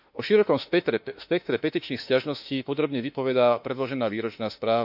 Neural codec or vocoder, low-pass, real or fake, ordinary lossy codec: autoencoder, 48 kHz, 32 numbers a frame, DAC-VAE, trained on Japanese speech; 5.4 kHz; fake; none